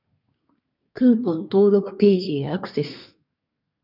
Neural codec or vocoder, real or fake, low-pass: codec, 24 kHz, 1 kbps, SNAC; fake; 5.4 kHz